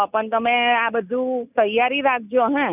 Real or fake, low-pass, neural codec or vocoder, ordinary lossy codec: real; 3.6 kHz; none; none